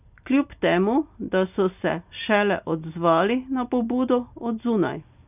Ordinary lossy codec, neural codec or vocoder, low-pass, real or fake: none; none; 3.6 kHz; real